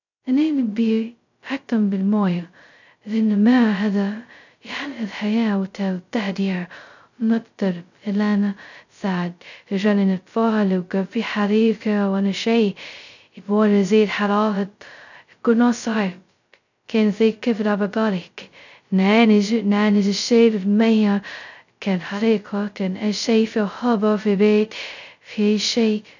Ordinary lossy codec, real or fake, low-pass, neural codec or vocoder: none; fake; 7.2 kHz; codec, 16 kHz, 0.2 kbps, FocalCodec